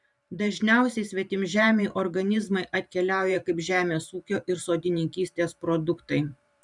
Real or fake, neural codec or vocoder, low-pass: fake; vocoder, 44.1 kHz, 128 mel bands every 512 samples, BigVGAN v2; 10.8 kHz